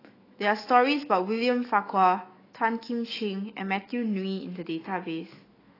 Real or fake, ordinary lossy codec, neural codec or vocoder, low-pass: fake; AAC, 24 kbps; autoencoder, 48 kHz, 128 numbers a frame, DAC-VAE, trained on Japanese speech; 5.4 kHz